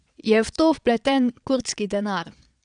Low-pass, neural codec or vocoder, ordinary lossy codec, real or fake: 9.9 kHz; vocoder, 22.05 kHz, 80 mel bands, Vocos; none; fake